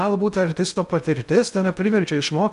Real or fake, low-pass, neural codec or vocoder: fake; 10.8 kHz; codec, 16 kHz in and 24 kHz out, 0.6 kbps, FocalCodec, streaming, 2048 codes